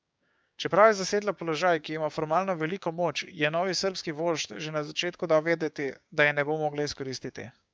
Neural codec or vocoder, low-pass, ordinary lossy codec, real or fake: codec, 16 kHz, 6 kbps, DAC; none; none; fake